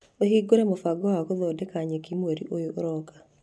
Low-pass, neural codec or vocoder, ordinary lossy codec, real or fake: none; none; none; real